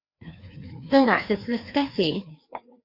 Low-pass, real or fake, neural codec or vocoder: 5.4 kHz; fake; codec, 16 kHz, 2 kbps, FreqCodec, larger model